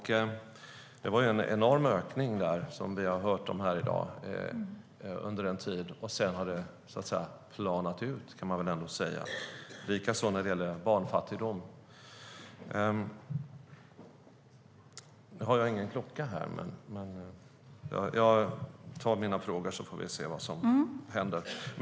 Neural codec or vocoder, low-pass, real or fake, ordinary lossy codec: none; none; real; none